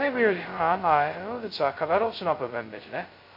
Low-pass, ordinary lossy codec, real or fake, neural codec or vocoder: 5.4 kHz; MP3, 32 kbps; fake; codec, 16 kHz, 0.2 kbps, FocalCodec